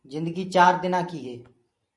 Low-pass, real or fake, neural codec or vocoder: 10.8 kHz; fake; vocoder, 44.1 kHz, 128 mel bands every 512 samples, BigVGAN v2